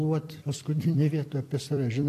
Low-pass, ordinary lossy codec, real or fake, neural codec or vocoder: 14.4 kHz; AAC, 64 kbps; fake; vocoder, 44.1 kHz, 128 mel bands every 256 samples, BigVGAN v2